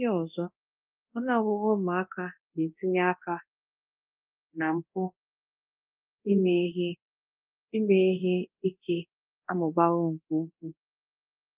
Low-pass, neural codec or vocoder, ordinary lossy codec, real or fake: 3.6 kHz; codec, 24 kHz, 0.9 kbps, DualCodec; Opus, 32 kbps; fake